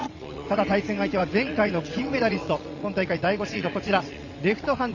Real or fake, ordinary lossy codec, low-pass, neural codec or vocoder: fake; Opus, 64 kbps; 7.2 kHz; vocoder, 22.05 kHz, 80 mel bands, WaveNeXt